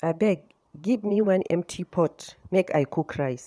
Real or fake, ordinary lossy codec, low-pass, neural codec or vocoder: fake; none; none; vocoder, 22.05 kHz, 80 mel bands, Vocos